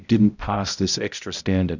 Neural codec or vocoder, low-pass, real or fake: codec, 16 kHz, 0.5 kbps, X-Codec, HuBERT features, trained on balanced general audio; 7.2 kHz; fake